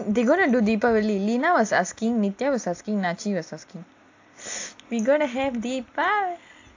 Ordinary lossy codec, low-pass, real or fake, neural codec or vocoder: AAC, 48 kbps; 7.2 kHz; real; none